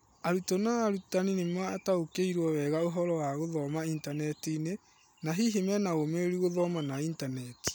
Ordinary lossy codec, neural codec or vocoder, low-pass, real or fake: none; none; none; real